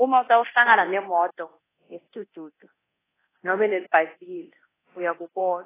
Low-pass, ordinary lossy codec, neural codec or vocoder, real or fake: 3.6 kHz; AAC, 16 kbps; codec, 24 kHz, 0.9 kbps, DualCodec; fake